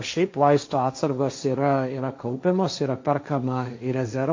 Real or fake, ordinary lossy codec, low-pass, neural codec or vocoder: fake; MP3, 48 kbps; 7.2 kHz; codec, 16 kHz, 1.1 kbps, Voila-Tokenizer